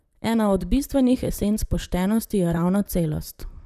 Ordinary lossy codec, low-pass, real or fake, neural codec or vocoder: none; 14.4 kHz; fake; vocoder, 44.1 kHz, 128 mel bands, Pupu-Vocoder